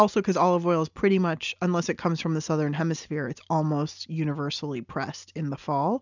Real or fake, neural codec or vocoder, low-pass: real; none; 7.2 kHz